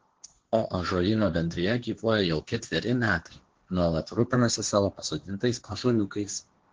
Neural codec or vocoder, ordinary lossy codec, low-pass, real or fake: codec, 16 kHz, 1.1 kbps, Voila-Tokenizer; Opus, 16 kbps; 7.2 kHz; fake